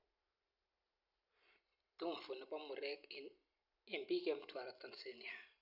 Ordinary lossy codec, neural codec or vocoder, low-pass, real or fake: none; none; 5.4 kHz; real